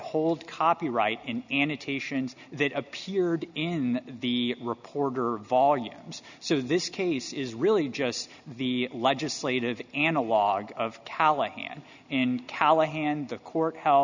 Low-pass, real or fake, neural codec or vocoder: 7.2 kHz; real; none